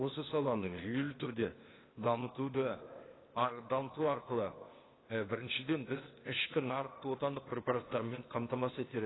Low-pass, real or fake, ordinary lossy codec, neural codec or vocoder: 7.2 kHz; fake; AAC, 16 kbps; codec, 16 kHz, 0.8 kbps, ZipCodec